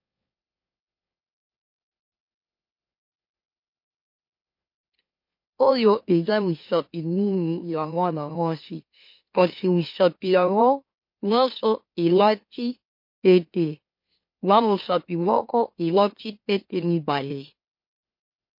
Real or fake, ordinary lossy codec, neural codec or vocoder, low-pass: fake; MP3, 32 kbps; autoencoder, 44.1 kHz, a latent of 192 numbers a frame, MeloTTS; 5.4 kHz